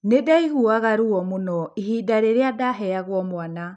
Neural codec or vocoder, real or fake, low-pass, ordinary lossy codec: none; real; 9.9 kHz; none